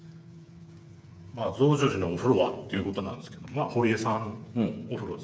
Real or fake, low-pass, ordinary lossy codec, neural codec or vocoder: fake; none; none; codec, 16 kHz, 8 kbps, FreqCodec, smaller model